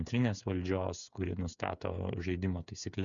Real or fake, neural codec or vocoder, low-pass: fake; codec, 16 kHz, 8 kbps, FreqCodec, smaller model; 7.2 kHz